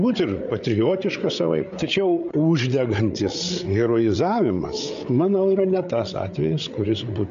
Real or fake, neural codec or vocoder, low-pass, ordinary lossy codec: fake; codec, 16 kHz, 8 kbps, FreqCodec, larger model; 7.2 kHz; MP3, 64 kbps